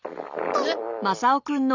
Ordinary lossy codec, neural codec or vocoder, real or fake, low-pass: none; none; real; 7.2 kHz